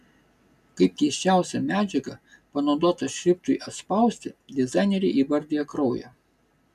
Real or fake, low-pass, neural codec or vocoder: real; 14.4 kHz; none